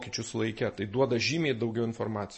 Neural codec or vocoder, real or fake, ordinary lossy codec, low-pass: none; real; MP3, 32 kbps; 10.8 kHz